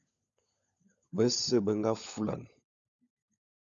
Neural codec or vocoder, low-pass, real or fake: codec, 16 kHz, 16 kbps, FunCodec, trained on LibriTTS, 50 frames a second; 7.2 kHz; fake